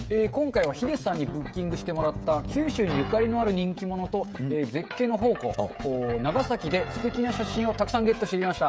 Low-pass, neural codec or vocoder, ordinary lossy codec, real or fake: none; codec, 16 kHz, 16 kbps, FreqCodec, smaller model; none; fake